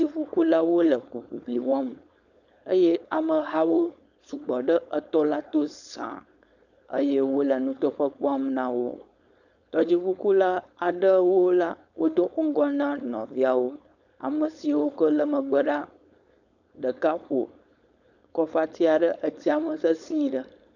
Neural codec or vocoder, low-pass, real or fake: codec, 16 kHz, 4.8 kbps, FACodec; 7.2 kHz; fake